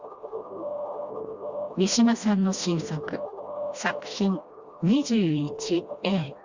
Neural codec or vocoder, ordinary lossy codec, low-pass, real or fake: codec, 16 kHz, 1 kbps, FreqCodec, smaller model; Opus, 64 kbps; 7.2 kHz; fake